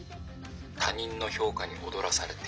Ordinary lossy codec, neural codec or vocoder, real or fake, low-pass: none; none; real; none